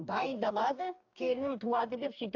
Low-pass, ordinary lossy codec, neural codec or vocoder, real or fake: 7.2 kHz; AAC, 48 kbps; codec, 44.1 kHz, 2.6 kbps, DAC; fake